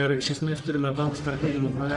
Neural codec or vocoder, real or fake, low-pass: codec, 44.1 kHz, 1.7 kbps, Pupu-Codec; fake; 10.8 kHz